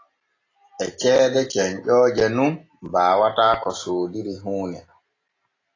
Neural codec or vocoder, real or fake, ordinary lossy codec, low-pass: none; real; AAC, 32 kbps; 7.2 kHz